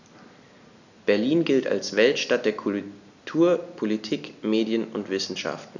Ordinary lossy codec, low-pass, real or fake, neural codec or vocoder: none; 7.2 kHz; real; none